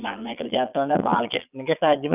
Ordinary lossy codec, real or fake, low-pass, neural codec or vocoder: none; fake; 3.6 kHz; vocoder, 22.05 kHz, 80 mel bands, Vocos